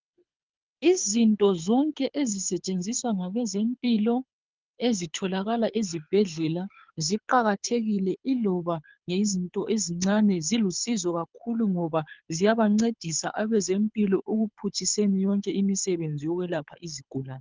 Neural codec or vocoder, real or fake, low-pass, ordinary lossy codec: codec, 24 kHz, 6 kbps, HILCodec; fake; 7.2 kHz; Opus, 24 kbps